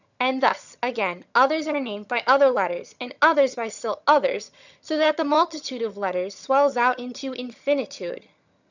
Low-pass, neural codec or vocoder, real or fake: 7.2 kHz; vocoder, 22.05 kHz, 80 mel bands, HiFi-GAN; fake